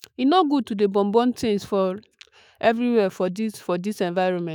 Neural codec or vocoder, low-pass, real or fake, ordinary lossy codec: autoencoder, 48 kHz, 128 numbers a frame, DAC-VAE, trained on Japanese speech; none; fake; none